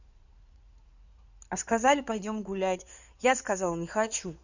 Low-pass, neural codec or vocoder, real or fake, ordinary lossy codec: 7.2 kHz; codec, 16 kHz in and 24 kHz out, 2.2 kbps, FireRedTTS-2 codec; fake; none